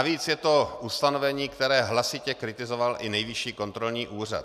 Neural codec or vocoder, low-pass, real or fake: none; 14.4 kHz; real